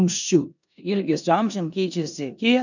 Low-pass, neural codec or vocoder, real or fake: 7.2 kHz; codec, 16 kHz in and 24 kHz out, 0.9 kbps, LongCat-Audio-Codec, four codebook decoder; fake